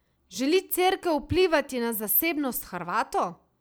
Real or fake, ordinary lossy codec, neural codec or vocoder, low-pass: real; none; none; none